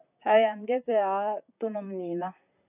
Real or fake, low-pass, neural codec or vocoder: fake; 3.6 kHz; vocoder, 44.1 kHz, 128 mel bands, Pupu-Vocoder